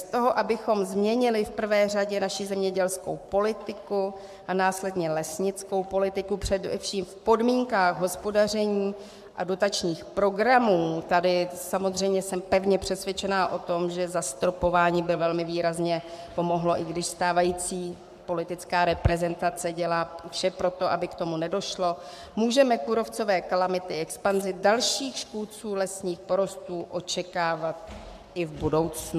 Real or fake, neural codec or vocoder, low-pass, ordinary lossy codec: fake; codec, 44.1 kHz, 7.8 kbps, Pupu-Codec; 14.4 kHz; MP3, 96 kbps